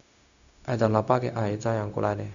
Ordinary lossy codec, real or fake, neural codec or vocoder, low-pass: none; fake; codec, 16 kHz, 0.4 kbps, LongCat-Audio-Codec; 7.2 kHz